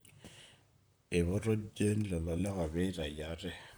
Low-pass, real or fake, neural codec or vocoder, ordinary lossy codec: none; fake; vocoder, 44.1 kHz, 128 mel bands, Pupu-Vocoder; none